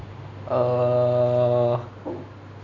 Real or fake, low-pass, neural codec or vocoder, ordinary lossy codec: real; 7.2 kHz; none; none